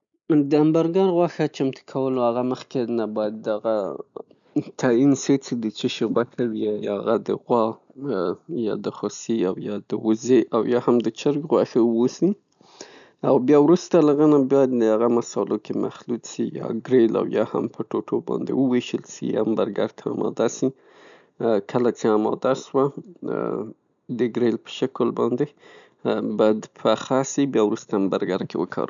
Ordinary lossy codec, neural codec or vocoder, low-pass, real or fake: none; none; 7.2 kHz; real